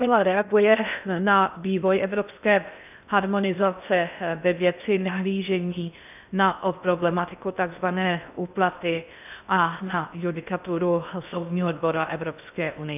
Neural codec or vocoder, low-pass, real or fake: codec, 16 kHz in and 24 kHz out, 0.6 kbps, FocalCodec, streaming, 2048 codes; 3.6 kHz; fake